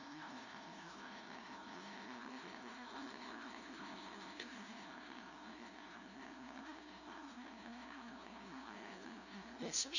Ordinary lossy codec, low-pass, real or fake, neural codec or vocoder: none; 7.2 kHz; fake; codec, 16 kHz, 0.5 kbps, FunCodec, trained on LibriTTS, 25 frames a second